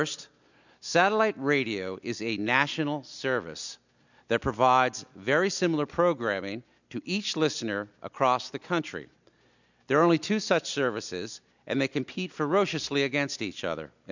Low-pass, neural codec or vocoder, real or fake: 7.2 kHz; none; real